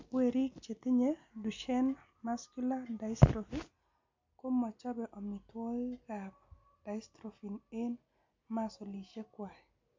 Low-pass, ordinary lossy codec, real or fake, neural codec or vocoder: 7.2 kHz; MP3, 48 kbps; real; none